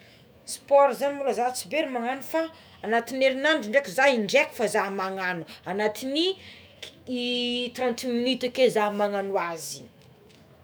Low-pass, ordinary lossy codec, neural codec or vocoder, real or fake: none; none; autoencoder, 48 kHz, 128 numbers a frame, DAC-VAE, trained on Japanese speech; fake